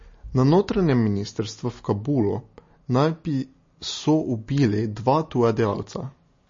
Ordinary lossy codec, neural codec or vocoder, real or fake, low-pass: MP3, 32 kbps; none; real; 7.2 kHz